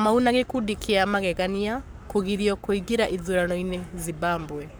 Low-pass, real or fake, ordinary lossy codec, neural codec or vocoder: none; fake; none; codec, 44.1 kHz, 7.8 kbps, Pupu-Codec